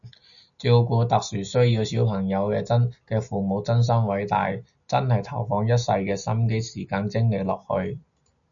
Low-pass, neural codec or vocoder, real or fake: 7.2 kHz; none; real